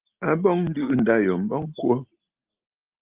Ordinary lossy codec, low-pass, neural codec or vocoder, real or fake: Opus, 32 kbps; 3.6 kHz; none; real